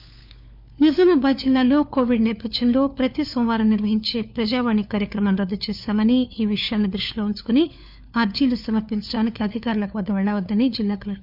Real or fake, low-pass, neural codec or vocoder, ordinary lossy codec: fake; 5.4 kHz; codec, 16 kHz, 4 kbps, FunCodec, trained on LibriTTS, 50 frames a second; none